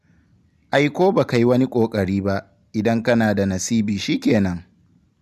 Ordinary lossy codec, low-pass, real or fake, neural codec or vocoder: none; 14.4 kHz; real; none